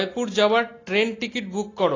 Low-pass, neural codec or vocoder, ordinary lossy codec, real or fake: 7.2 kHz; none; AAC, 32 kbps; real